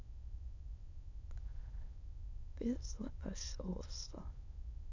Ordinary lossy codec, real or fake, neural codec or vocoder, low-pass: none; fake; autoencoder, 22.05 kHz, a latent of 192 numbers a frame, VITS, trained on many speakers; 7.2 kHz